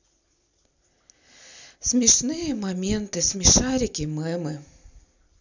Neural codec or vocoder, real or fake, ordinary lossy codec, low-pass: none; real; none; 7.2 kHz